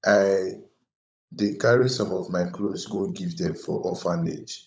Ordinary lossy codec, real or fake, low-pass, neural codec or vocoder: none; fake; none; codec, 16 kHz, 16 kbps, FunCodec, trained on LibriTTS, 50 frames a second